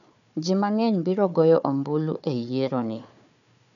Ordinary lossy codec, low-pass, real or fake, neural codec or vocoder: none; 7.2 kHz; fake; codec, 16 kHz, 4 kbps, FunCodec, trained on Chinese and English, 50 frames a second